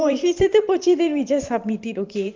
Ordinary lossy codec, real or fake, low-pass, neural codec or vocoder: Opus, 32 kbps; fake; 7.2 kHz; vocoder, 44.1 kHz, 128 mel bands every 512 samples, BigVGAN v2